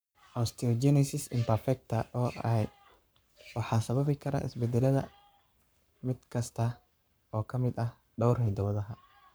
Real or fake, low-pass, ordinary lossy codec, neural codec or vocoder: fake; none; none; codec, 44.1 kHz, 7.8 kbps, Pupu-Codec